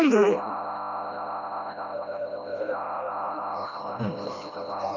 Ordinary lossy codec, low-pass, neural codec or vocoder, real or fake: none; 7.2 kHz; codec, 16 kHz, 1 kbps, FreqCodec, smaller model; fake